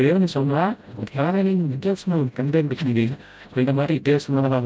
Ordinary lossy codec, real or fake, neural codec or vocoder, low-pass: none; fake; codec, 16 kHz, 0.5 kbps, FreqCodec, smaller model; none